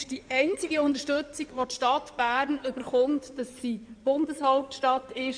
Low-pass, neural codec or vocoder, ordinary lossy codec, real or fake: 9.9 kHz; codec, 16 kHz in and 24 kHz out, 2.2 kbps, FireRedTTS-2 codec; none; fake